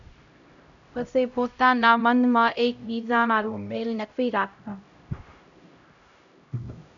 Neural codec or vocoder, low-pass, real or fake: codec, 16 kHz, 0.5 kbps, X-Codec, HuBERT features, trained on LibriSpeech; 7.2 kHz; fake